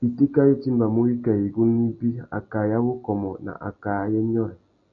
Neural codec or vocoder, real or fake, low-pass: none; real; 7.2 kHz